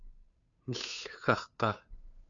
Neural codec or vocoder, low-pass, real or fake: codec, 16 kHz, 2 kbps, FunCodec, trained on Chinese and English, 25 frames a second; 7.2 kHz; fake